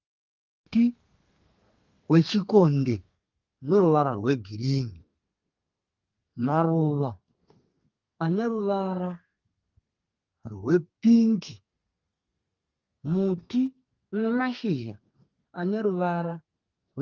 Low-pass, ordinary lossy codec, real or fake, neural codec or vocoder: 7.2 kHz; Opus, 32 kbps; fake; codec, 32 kHz, 1.9 kbps, SNAC